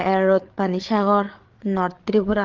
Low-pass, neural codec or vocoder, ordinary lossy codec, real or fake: 7.2 kHz; codec, 16 kHz, 8 kbps, FreqCodec, larger model; Opus, 16 kbps; fake